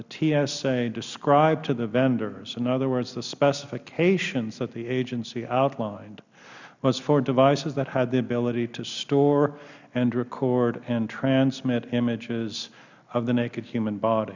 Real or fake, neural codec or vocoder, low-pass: real; none; 7.2 kHz